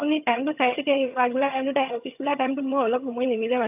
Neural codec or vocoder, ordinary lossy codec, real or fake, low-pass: vocoder, 22.05 kHz, 80 mel bands, HiFi-GAN; AAC, 32 kbps; fake; 3.6 kHz